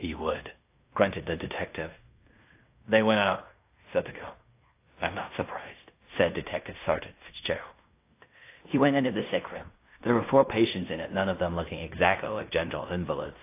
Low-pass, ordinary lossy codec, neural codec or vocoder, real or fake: 3.6 kHz; AAC, 24 kbps; codec, 16 kHz in and 24 kHz out, 0.9 kbps, LongCat-Audio-Codec, fine tuned four codebook decoder; fake